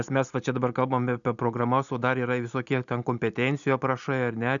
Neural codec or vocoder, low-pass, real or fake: none; 7.2 kHz; real